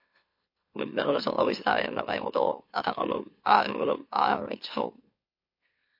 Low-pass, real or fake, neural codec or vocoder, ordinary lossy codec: 5.4 kHz; fake; autoencoder, 44.1 kHz, a latent of 192 numbers a frame, MeloTTS; MP3, 32 kbps